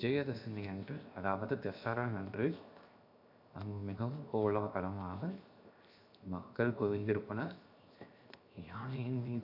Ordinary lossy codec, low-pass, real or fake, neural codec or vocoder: none; 5.4 kHz; fake; codec, 16 kHz, 0.7 kbps, FocalCodec